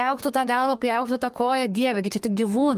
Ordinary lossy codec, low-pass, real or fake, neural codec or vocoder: Opus, 32 kbps; 14.4 kHz; fake; codec, 32 kHz, 1.9 kbps, SNAC